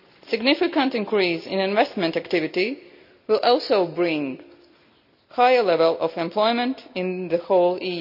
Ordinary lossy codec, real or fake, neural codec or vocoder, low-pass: none; real; none; 5.4 kHz